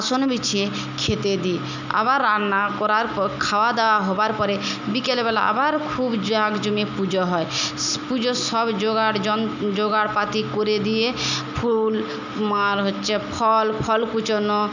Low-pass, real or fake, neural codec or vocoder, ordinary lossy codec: 7.2 kHz; real; none; none